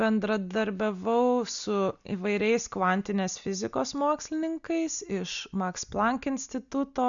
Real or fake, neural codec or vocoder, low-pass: real; none; 7.2 kHz